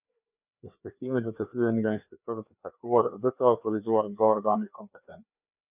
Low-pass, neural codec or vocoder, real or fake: 3.6 kHz; codec, 16 kHz, 2 kbps, FreqCodec, larger model; fake